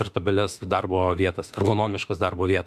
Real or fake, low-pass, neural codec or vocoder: fake; 14.4 kHz; autoencoder, 48 kHz, 32 numbers a frame, DAC-VAE, trained on Japanese speech